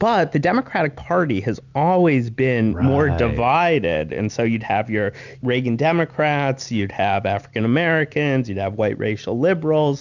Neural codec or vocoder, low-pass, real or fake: none; 7.2 kHz; real